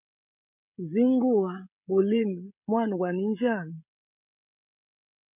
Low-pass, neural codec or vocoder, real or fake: 3.6 kHz; codec, 16 kHz, 16 kbps, FreqCodec, smaller model; fake